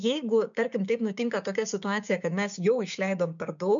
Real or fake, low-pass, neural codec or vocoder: fake; 7.2 kHz; codec, 16 kHz, 6 kbps, DAC